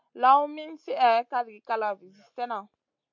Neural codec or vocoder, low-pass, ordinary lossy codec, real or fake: none; 7.2 kHz; MP3, 64 kbps; real